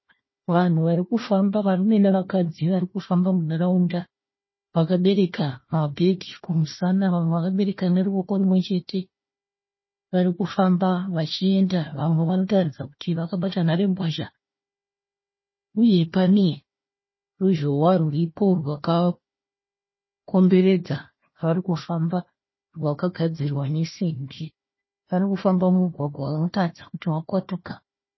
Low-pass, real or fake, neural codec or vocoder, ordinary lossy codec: 7.2 kHz; fake; codec, 16 kHz, 1 kbps, FunCodec, trained on Chinese and English, 50 frames a second; MP3, 24 kbps